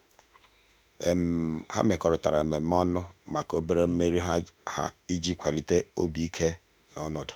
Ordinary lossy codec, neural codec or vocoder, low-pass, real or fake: none; autoencoder, 48 kHz, 32 numbers a frame, DAC-VAE, trained on Japanese speech; none; fake